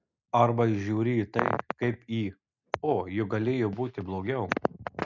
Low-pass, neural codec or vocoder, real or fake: 7.2 kHz; none; real